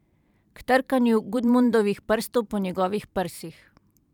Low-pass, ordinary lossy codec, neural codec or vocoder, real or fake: 19.8 kHz; none; vocoder, 44.1 kHz, 128 mel bands every 512 samples, BigVGAN v2; fake